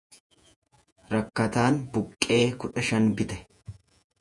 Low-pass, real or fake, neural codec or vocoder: 10.8 kHz; fake; vocoder, 48 kHz, 128 mel bands, Vocos